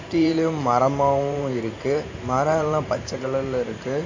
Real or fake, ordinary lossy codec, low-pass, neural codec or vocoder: fake; AAC, 48 kbps; 7.2 kHz; vocoder, 44.1 kHz, 128 mel bands every 512 samples, BigVGAN v2